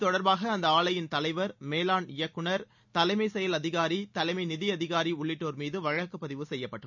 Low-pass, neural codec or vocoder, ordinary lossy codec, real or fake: 7.2 kHz; none; none; real